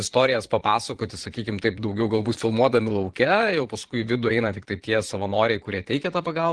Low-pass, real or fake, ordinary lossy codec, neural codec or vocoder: 9.9 kHz; fake; Opus, 16 kbps; vocoder, 22.05 kHz, 80 mel bands, Vocos